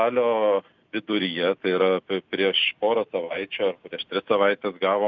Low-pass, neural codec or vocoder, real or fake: 7.2 kHz; none; real